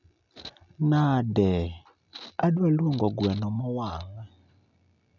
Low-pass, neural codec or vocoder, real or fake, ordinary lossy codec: 7.2 kHz; none; real; none